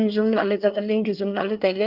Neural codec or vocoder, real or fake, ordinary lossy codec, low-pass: codec, 24 kHz, 1 kbps, SNAC; fake; Opus, 24 kbps; 5.4 kHz